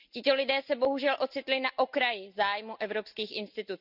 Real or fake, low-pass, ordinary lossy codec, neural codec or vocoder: real; 5.4 kHz; none; none